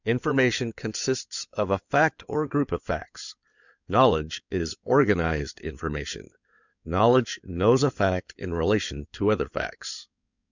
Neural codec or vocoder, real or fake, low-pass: codec, 16 kHz in and 24 kHz out, 2.2 kbps, FireRedTTS-2 codec; fake; 7.2 kHz